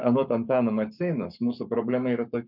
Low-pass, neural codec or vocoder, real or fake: 5.4 kHz; codec, 44.1 kHz, 7.8 kbps, Pupu-Codec; fake